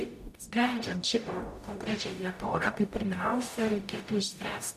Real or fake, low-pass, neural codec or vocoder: fake; 14.4 kHz; codec, 44.1 kHz, 0.9 kbps, DAC